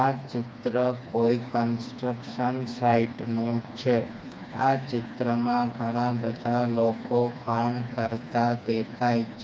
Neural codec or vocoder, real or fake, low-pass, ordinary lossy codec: codec, 16 kHz, 2 kbps, FreqCodec, smaller model; fake; none; none